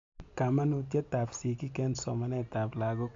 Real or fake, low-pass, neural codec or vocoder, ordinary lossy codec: real; 7.2 kHz; none; AAC, 48 kbps